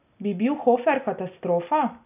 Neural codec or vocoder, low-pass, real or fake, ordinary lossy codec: none; 3.6 kHz; real; none